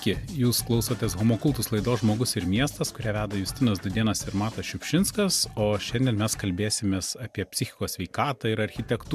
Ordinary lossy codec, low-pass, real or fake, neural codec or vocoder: MP3, 96 kbps; 14.4 kHz; real; none